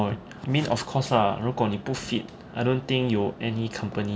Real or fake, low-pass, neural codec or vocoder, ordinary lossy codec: real; none; none; none